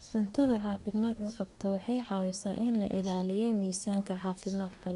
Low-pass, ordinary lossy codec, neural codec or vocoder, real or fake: 10.8 kHz; none; codec, 24 kHz, 1 kbps, SNAC; fake